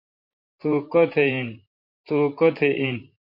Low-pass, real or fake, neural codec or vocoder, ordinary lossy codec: 5.4 kHz; fake; vocoder, 44.1 kHz, 128 mel bands, Pupu-Vocoder; MP3, 32 kbps